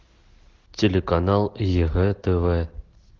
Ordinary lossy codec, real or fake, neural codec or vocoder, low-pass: Opus, 16 kbps; real; none; 7.2 kHz